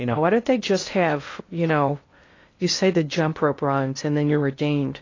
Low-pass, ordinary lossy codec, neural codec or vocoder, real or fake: 7.2 kHz; AAC, 32 kbps; codec, 16 kHz in and 24 kHz out, 0.6 kbps, FocalCodec, streaming, 2048 codes; fake